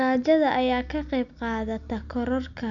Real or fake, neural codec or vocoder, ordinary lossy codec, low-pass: real; none; none; 7.2 kHz